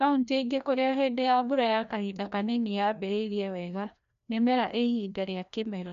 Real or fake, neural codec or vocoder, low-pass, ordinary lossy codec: fake; codec, 16 kHz, 1 kbps, FreqCodec, larger model; 7.2 kHz; none